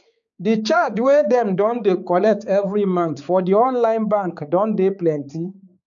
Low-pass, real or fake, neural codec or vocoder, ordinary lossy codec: 7.2 kHz; fake; codec, 16 kHz, 4 kbps, X-Codec, HuBERT features, trained on balanced general audio; none